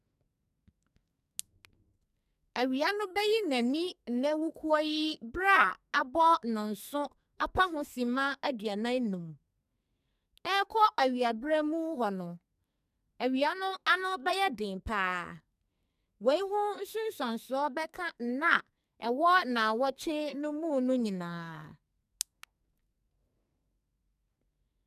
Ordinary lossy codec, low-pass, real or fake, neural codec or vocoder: none; 14.4 kHz; fake; codec, 44.1 kHz, 2.6 kbps, SNAC